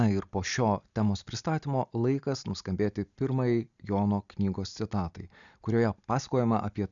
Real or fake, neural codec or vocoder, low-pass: real; none; 7.2 kHz